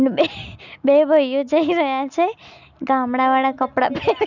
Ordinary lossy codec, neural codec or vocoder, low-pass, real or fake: none; none; 7.2 kHz; real